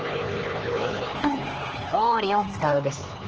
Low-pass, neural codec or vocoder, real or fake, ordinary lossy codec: 7.2 kHz; codec, 16 kHz, 4 kbps, X-Codec, HuBERT features, trained on LibriSpeech; fake; Opus, 16 kbps